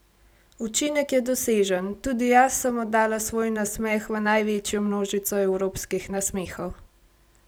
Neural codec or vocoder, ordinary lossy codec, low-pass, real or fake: none; none; none; real